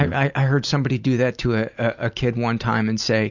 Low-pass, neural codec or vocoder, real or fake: 7.2 kHz; none; real